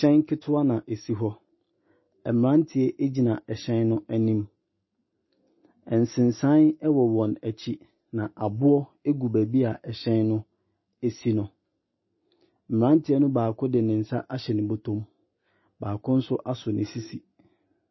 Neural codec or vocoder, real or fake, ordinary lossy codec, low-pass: none; real; MP3, 24 kbps; 7.2 kHz